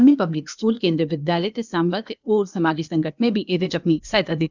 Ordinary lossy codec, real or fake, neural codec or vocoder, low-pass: none; fake; codec, 16 kHz, 0.8 kbps, ZipCodec; 7.2 kHz